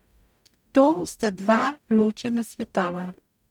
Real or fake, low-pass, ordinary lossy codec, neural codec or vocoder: fake; 19.8 kHz; none; codec, 44.1 kHz, 0.9 kbps, DAC